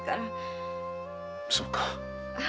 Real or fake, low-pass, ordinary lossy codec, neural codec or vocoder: real; none; none; none